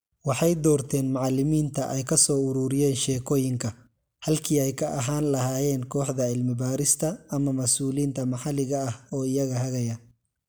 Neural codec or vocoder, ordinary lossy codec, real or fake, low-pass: none; none; real; none